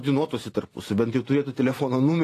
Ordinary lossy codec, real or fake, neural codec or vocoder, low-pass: AAC, 48 kbps; real; none; 14.4 kHz